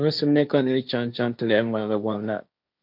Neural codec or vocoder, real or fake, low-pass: codec, 16 kHz, 1.1 kbps, Voila-Tokenizer; fake; 5.4 kHz